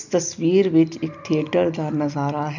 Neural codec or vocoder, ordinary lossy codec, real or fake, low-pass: none; none; real; 7.2 kHz